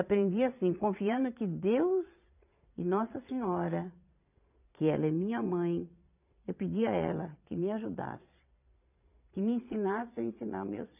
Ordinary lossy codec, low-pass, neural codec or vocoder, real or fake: none; 3.6 kHz; vocoder, 22.05 kHz, 80 mel bands, Vocos; fake